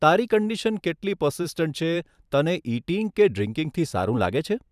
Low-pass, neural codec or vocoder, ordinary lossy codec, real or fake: 14.4 kHz; vocoder, 44.1 kHz, 128 mel bands every 512 samples, BigVGAN v2; none; fake